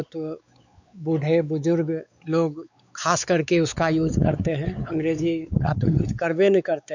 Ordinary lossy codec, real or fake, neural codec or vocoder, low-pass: none; fake; codec, 16 kHz, 4 kbps, X-Codec, WavLM features, trained on Multilingual LibriSpeech; 7.2 kHz